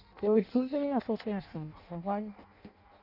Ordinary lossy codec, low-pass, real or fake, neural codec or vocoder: none; 5.4 kHz; fake; codec, 16 kHz in and 24 kHz out, 0.6 kbps, FireRedTTS-2 codec